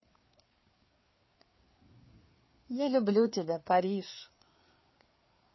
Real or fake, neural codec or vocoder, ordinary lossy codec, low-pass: fake; codec, 16 kHz, 4 kbps, FreqCodec, larger model; MP3, 24 kbps; 7.2 kHz